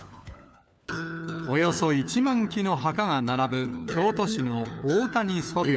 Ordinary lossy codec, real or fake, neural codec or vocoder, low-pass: none; fake; codec, 16 kHz, 4 kbps, FunCodec, trained on LibriTTS, 50 frames a second; none